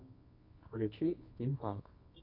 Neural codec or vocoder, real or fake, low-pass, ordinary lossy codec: codec, 24 kHz, 0.9 kbps, WavTokenizer, medium music audio release; fake; 5.4 kHz; AAC, 32 kbps